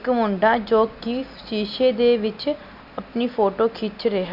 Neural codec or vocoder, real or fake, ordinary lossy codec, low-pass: none; real; none; 5.4 kHz